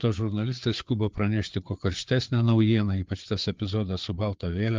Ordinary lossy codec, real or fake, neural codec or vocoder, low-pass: Opus, 24 kbps; fake; codec, 16 kHz, 4 kbps, FreqCodec, larger model; 7.2 kHz